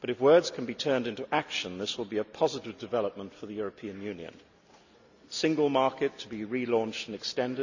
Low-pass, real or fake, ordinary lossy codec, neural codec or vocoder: 7.2 kHz; real; none; none